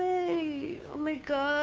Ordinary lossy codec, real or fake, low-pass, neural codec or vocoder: none; fake; none; codec, 16 kHz, 2 kbps, FunCodec, trained on Chinese and English, 25 frames a second